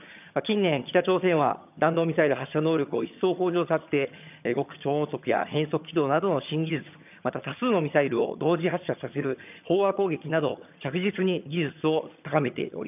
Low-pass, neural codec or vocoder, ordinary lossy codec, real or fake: 3.6 kHz; vocoder, 22.05 kHz, 80 mel bands, HiFi-GAN; none; fake